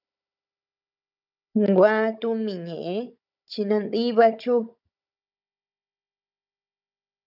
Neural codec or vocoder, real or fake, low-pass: codec, 16 kHz, 16 kbps, FunCodec, trained on Chinese and English, 50 frames a second; fake; 5.4 kHz